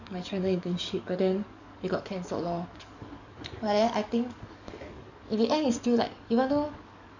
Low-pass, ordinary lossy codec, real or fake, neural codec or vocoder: 7.2 kHz; none; fake; codec, 44.1 kHz, 7.8 kbps, DAC